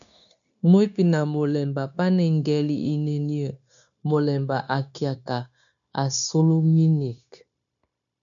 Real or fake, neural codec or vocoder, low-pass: fake; codec, 16 kHz, 0.9 kbps, LongCat-Audio-Codec; 7.2 kHz